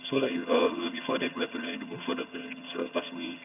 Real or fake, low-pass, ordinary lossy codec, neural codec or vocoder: fake; 3.6 kHz; none; vocoder, 22.05 kHz, 80 mel bands, HiFi-GAN